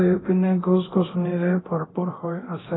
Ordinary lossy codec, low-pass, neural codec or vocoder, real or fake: AAC, 16 kbps; 7.2 kHz; codec, 24 kHz, 0.9 kbps, DualCodec; fake